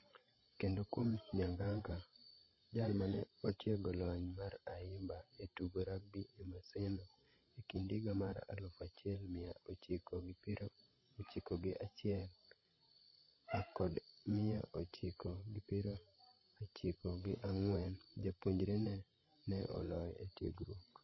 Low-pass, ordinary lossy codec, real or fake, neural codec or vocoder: 7.2 kHz; MP3, 24 kbps; fake; codec, 16 kHz, 16 kbps, FreqCodec, larger model